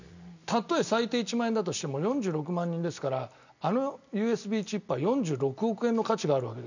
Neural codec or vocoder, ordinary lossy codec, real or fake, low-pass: none; none; real; 7.2 kHz